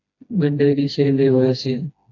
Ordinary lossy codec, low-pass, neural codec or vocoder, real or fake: AAC, 48 kbps; 7.2 kHz; codec, 16 kHz, 1 kbps, FreqCodec, smaller model; fake